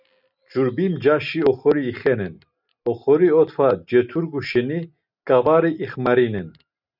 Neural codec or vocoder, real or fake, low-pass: none; real; 5.4 kHz